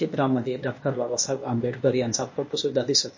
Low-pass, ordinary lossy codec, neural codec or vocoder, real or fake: 7.2 kHz; MP3, 32 kbps; codec, 16 kHz, 0.8 kbps, ZipCodec; fake